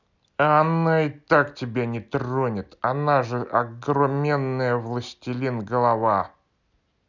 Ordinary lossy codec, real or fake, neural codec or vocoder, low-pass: none; real; none; 7.2 kHz